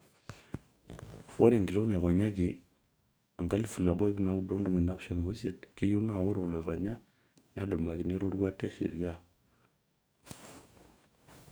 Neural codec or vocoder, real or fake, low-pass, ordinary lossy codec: codec, 44.1 kHz, 2.6 kbps, DAC; fake; none; none